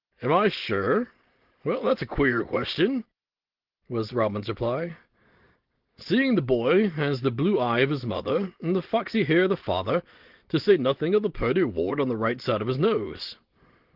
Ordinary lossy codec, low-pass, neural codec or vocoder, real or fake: Opus, 16 kbps; 5.4 kHz; none; real